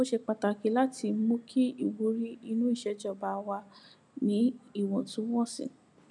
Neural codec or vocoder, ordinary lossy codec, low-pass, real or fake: none; none; none; real